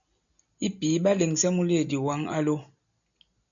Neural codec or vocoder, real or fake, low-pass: none; real; 7.2 kHz